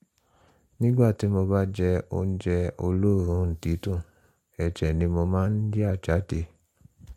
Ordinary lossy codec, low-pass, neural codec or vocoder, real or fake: MP3, 64 kbps; 19.8 kHz; none; real